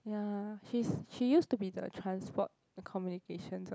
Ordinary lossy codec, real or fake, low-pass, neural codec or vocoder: none; real; none; none